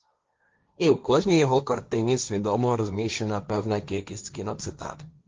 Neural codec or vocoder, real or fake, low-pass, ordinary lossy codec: codec, 16 kHz, 1.1 kbps, Voila-Tokenizer; fake; 7.2 kHz; Opus, 24 kbps